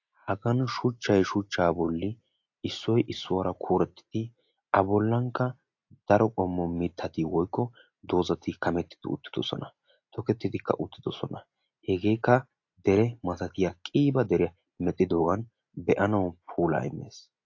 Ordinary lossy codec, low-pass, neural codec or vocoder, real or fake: AAC, 48 kbps; 7.2 kHz; none; real